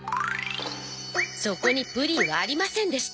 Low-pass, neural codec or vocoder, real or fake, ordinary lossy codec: none; none; real; none